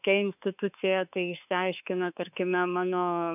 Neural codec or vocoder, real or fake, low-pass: autoencoder, 48 kHz, 32 numbers a frame, DAC-VAE, trained on Japanese speech; fake; 3.6 kHz